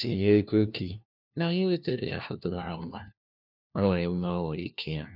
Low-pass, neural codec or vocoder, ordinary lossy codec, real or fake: 5.4 kHz; codec, 16 kHz, 1 kbps, FunCodec, trained on LibriTTS, 50 frames a second; none; fake